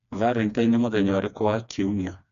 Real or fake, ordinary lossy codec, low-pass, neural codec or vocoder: fake; none; 7.2 kHz; codec, 16 kHz, 2 kbps, FreqCodec, smaller model